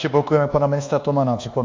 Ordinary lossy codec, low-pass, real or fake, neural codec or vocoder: AAC, 48 kbps; 7.2 kHz; fake; codec, 24 kHz, 1.2 kbps, DualCodec